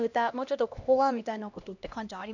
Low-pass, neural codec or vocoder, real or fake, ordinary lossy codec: 7.2 kHz; codec, 16 kHz, 1 kbps, X-Codec, HuBERT features, trained on LibriSpeech; fake; none